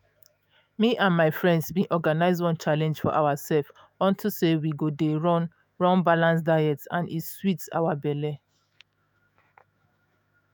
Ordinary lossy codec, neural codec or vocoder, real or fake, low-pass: none; autoencoder, 48 kHz, 128 numbers a frame, DAC-VAE, trained on Japanese speech; fake; none